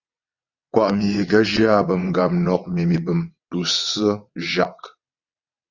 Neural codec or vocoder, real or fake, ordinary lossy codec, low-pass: vocoder, 22.05 kHz, 80 mel bands, WaveNeXt; fake; Opus, 64 kbps; 7.2 kHz